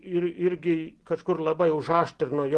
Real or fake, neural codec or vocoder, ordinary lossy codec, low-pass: real; none; Opus, 16 kbps; 10.8 kHz